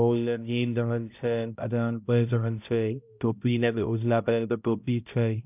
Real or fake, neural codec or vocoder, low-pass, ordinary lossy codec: fake; codec, 16 kHz, 0.5 kbps, X-Codec, HuBERT features, trained on balanced general audio; 3.6 kHz; none